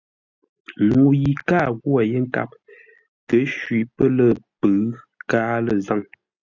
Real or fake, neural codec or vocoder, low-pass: real; none; 7.2 kHz